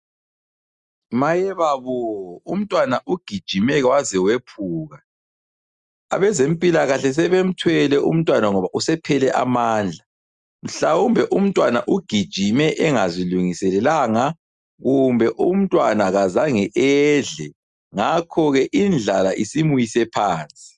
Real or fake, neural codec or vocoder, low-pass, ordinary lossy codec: real; none; 10.8 kHz; Opus, 64 kbps